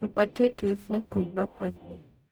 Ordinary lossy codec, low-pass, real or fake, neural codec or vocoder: none; none; fake; codec, 44.1 kHz, 0.9 kbps, DAC